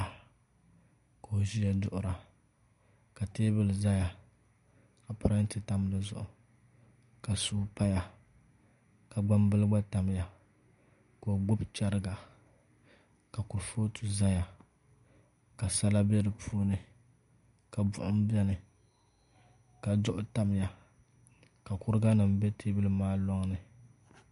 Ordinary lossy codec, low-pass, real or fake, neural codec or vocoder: AAC, 96 kbps; 10.8 kHz; real; none